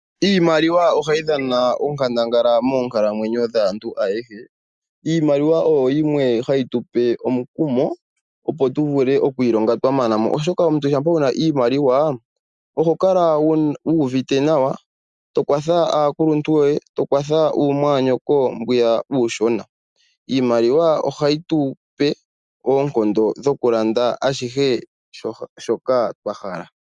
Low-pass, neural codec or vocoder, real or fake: 10.8 kHz; none; real